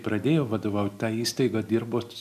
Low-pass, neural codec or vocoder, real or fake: 14.4 kHz; none; real